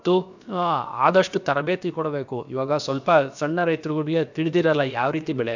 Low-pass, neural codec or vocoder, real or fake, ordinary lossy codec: 7.2 kHz; codec, 16 kHz, about 1 kbps, DyCAST, with the encoder's durations; fake; none